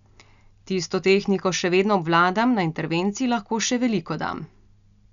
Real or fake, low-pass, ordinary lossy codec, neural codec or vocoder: real; 7.2 kHz; none; none